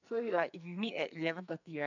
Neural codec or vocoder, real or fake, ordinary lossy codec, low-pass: codec, 44.1 kHz, 2.6 kbps, SNAC; fake; none; 7.2 kHz